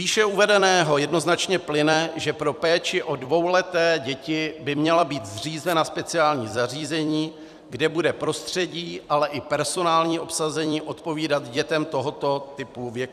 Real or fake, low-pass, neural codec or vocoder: fake; 14.4 kHz; vocoder, 44.1 kHz, 128 mel bands every 256 samples, BigVGAN v2